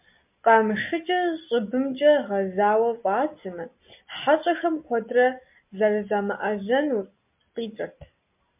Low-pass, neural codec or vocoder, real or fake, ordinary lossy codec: 3.6 kHz; none; real; MP3, 32 kbps